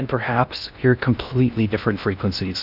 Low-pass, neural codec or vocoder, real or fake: 5.4 kHz; codec, 16 kHz in and 24 kHz out, 0.6 kbps, FocalCodec, streaming, 2048 codes; fake